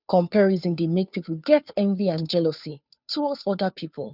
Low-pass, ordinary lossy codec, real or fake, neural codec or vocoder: 5.4 kHz; none; fake; codec, 16 kHz, 2 kbps, FunCodec, trained on Chinese and English, 25 frames a second